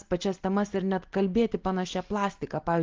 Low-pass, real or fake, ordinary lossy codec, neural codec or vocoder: 7.2 kHz; real; Opus, 16 kbps; none